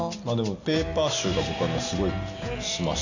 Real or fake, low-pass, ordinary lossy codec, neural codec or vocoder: real; 7.2 kHz; none; none